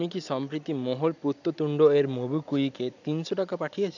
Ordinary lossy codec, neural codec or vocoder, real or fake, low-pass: none; none; real; 7.2 kHz